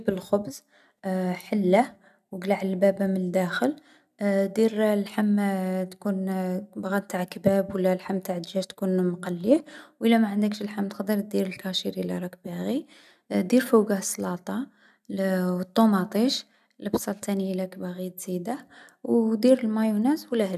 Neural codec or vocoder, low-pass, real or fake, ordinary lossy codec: none; 14.4 kHz; real; none